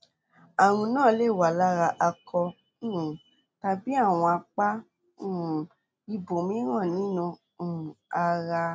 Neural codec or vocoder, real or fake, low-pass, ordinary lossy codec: none; real; none; none